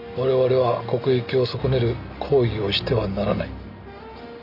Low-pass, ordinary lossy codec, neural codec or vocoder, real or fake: 5.4 kHz; AAC, 48 kbps; none; real